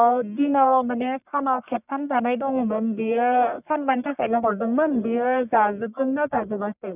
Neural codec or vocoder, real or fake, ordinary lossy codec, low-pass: codec, 44.1 kHz, 1.7 kbps, Pupu-Codec; fake; none; 3.6 kHz